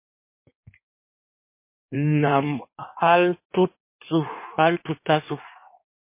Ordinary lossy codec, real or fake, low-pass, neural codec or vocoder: MP3, 24 kbps; fake; 3.6 kHz; codec, 16 kHz in and 24 kHz out, 1.1 kbps, FireRedTTS-2 codec